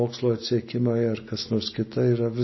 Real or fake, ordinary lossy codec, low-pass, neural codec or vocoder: real; MP3, 24 kbps; 7.2 kHz; none